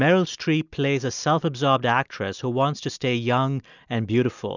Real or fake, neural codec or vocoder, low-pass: real; none; 7.2 kHz